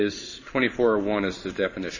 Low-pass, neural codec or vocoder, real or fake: 7.2 kHz; codec, 16 kHz in and 24 kHz out, 1 kbps, XY-Tokenizer; fake